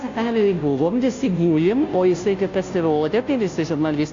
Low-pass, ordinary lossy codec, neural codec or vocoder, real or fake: 7.2 kHz; AAC, 48 kbps; codec, 16 kHz, 0.5 kbps, FunCodec, trained on Chinese and English, 25 frames a second; fake